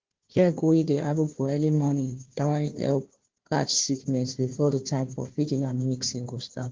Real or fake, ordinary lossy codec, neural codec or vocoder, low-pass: fake; Opus, 16 kbps; codec, 16 kHz, 1 kbps, FunCodec, trained on Chinese and English, 50 frames a second; 7.2 kHz